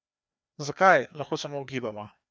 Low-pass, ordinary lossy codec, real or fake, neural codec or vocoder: none; none; fake; codec, 16 kHz, 2 kbps, FreqCodec, larger model